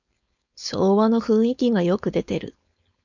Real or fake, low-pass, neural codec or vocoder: fake; 7.2 kHz; codec, 16 kHz, 4.8 kbps, FACodec